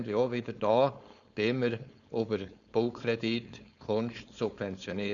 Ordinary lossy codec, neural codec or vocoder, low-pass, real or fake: none; codec, 16 kHz, 4.8 kbps, FACodec; 7.2 kHz; fake